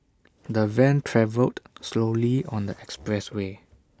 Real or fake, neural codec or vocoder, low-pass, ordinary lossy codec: real; none; none; none